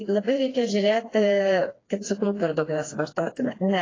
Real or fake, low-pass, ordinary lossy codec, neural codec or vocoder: fake; 7.2 kHz; AAC, 32 kbps; codec, 16 kHz, 2 kbps, FreqCodec, smaller model